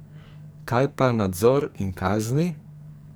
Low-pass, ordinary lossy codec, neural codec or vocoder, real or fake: none; none; codec, 44.1 kHz, 2.6 kbps, SNAC; fake